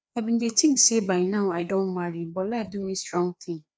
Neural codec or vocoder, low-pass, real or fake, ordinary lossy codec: codec, 16 kHz, 2 kbps, FreqCodec, larger model; none; fake; none